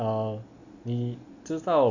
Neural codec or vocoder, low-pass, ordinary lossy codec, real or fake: none; 7.2 kHz; none; real